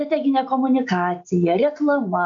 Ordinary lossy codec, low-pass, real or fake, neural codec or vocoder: AAC, 64 kbps; 7.2 kHz; real; none